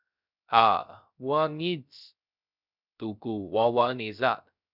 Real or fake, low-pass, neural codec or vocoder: fake; 5.4 kHz; codec, 16 kHz, 0.3 kbps, FocalCodec